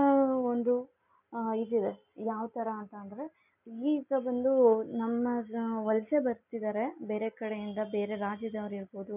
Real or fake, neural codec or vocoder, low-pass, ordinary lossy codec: real; none; 3.6 kHz; none